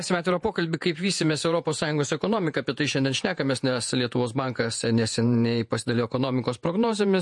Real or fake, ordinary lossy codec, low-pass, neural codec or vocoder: fake; MP3, 48 kbps; 10.8 kHz; vocoder, 48 kHz, 128 mel bands, Vocos